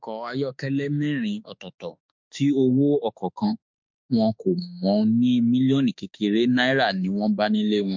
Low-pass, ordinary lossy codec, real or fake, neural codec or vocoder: 7.2 kHz; MP3, 64 kbps; fake; autoencoder, 48 kHz, 32 numbers a frame, DAC-VAE, trained on Japanese speech